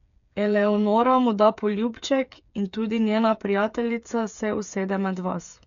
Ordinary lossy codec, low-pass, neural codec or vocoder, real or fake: none; 7.2 kHz; codec, 16 kHz, 8 kbps, FreqCodec, smaller model; fake